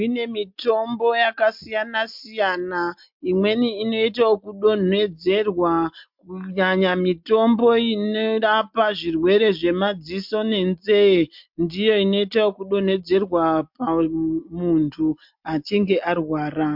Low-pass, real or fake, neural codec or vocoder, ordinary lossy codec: 5.4 kHz; real; none; MP3, 48 kbps